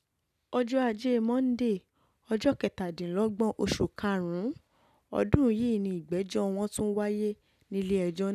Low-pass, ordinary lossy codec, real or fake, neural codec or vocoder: 14.4 kHz; none; real; none